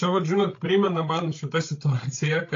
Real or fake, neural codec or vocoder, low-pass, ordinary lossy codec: fake; codec, 16 kHz, 8 kbps, FreqCodec, larger model; 7.2 kHz; AAC, 48 kbps